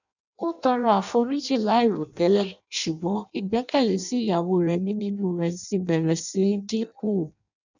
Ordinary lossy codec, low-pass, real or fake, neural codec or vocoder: none; 7.2 kHz; fake; codec, 16 kHz in and 24 kHz out, 0.6 kbps, FireRedTTS-2 codec